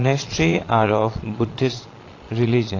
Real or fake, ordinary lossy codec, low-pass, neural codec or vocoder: fake; AAC, 32 kbps; 7.2 kHz; vocoder, 22.05 kHz, 80 mel bands, Vocos